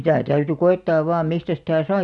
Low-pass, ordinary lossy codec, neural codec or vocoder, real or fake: 10.8 kHz; none; none; real